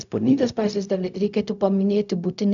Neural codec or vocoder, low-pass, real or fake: codec, 16 kHz, 0.4 kbps, LongCat-Audio-Codec; 7.2 kHz; fake